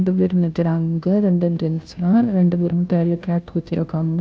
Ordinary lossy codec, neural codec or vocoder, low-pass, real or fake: none; codec, 16 kHz, 0.5 kbps, FunCodec, trained on Chinese and English, 25 frames a second; none; fake